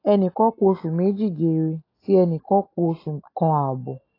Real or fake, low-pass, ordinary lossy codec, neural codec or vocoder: real; 5.4 kHz; AAC, 24 kbps; none